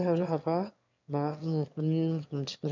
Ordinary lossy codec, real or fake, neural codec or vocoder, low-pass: MP3, 64 kbps; fake; autoencoder, 22.05 kHz, a latent of 192 numbers a frame, VITS, trained on one speaker; 7.2 kHz